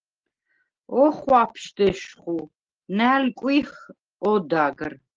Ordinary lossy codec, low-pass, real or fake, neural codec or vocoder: Opus, 16 kbps; 7.2 kHz; real; none